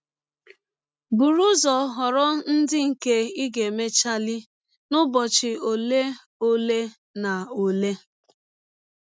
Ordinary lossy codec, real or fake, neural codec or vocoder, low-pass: none; real; none; none